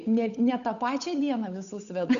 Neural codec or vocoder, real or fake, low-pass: codec, 16 kHz, 8 kbps, FunCodec, trained on Chinese and English, 25 frames a second; fake; 7.2 kHz